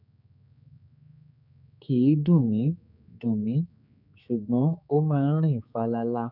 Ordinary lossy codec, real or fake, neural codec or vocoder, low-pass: none; fake; codec, 16 kHz, 4 kbps, X-Codec, HuBERT features, trained on general audio; 5.4 kHz